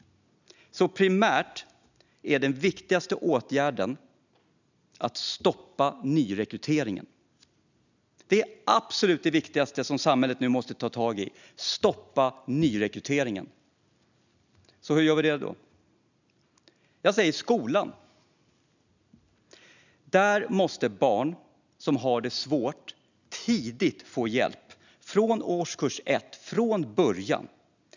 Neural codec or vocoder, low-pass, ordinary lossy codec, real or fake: none; 7.2 kHz; none; real